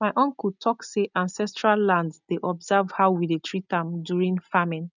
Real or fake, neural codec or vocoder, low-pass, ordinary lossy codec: real; none; 7.2 kHz; none